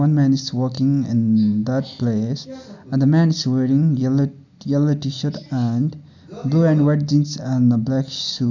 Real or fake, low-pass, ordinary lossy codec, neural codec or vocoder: real; 7.2 kHz; none; none